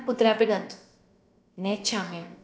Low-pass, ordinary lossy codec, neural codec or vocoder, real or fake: none; none; codec, 16 kHz, about 1 kbps, DyCAST, with the encoder's durations; fake